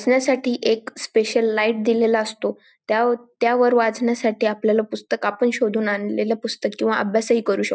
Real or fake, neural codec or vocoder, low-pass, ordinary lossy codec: real; none; none; none